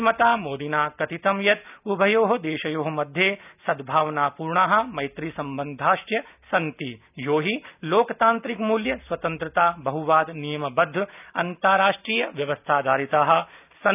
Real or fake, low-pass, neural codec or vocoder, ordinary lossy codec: real; 3.6 kHz; none; none